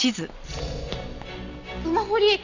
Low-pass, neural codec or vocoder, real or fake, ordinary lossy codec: 7.2 kHz; none; real; none